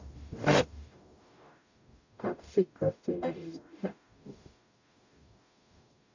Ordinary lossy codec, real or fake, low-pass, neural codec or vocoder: none; fake; 7.2 kHz; codec, 44.1 kHz, 0.9 kbps, DAC